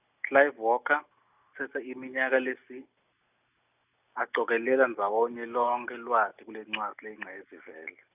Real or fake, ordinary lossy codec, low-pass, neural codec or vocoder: real; none; 3.6 kHz; none